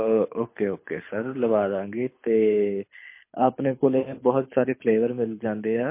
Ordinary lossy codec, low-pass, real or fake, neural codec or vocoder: MP3, 24 kbps; 3.6 kHz; fake; codec, 24 kHz, 6 kbps, HILCodec